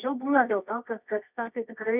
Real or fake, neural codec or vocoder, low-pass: fake; codec, 24 kHz, 0.9 kbps, WavTokenizer, medium music audio release; 3.6 kHz